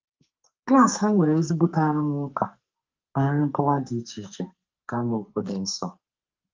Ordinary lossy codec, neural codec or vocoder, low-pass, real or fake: Opus, 32 kbps; codec, 44.1 kHz, 2.6 kbps, SNAC; 7.2 kHz; fake